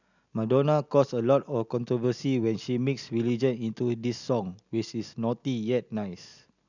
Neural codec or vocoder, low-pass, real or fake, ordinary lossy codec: none; 7.2 kHz; real; none